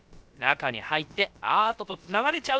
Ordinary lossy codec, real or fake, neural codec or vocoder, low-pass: none; fake; codec, 16 kHz, about 1 kbps, DyCAST, with the encoder's durations; none